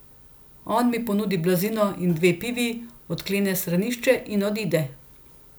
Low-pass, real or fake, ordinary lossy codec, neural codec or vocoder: none; real; none; none